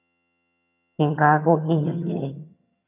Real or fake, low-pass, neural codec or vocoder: fake; 3.6 kHz; vocoder, 22.05 kHz, 80 mel bands, HiFi-GAN